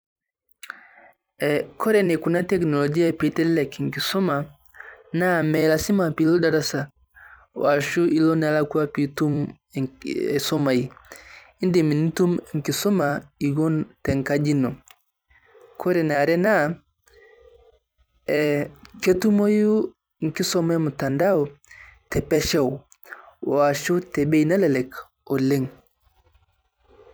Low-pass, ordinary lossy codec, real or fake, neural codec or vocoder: none; none; fake; vocoder, 44.1 kHz, 128 mel bands every 256 samples, BigVGAN v2